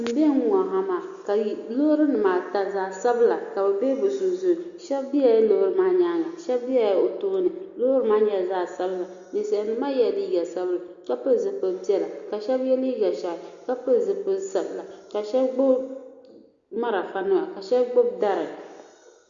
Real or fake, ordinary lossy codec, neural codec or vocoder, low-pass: real; Opus, 64 kbps; none; 7.2 kHz